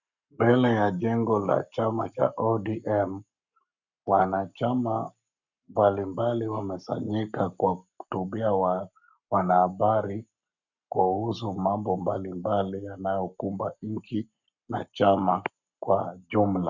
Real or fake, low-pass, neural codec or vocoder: fake; 7.2 kHz; codec, 44.1 kHz, 7.8 kbps, Pupu-Codec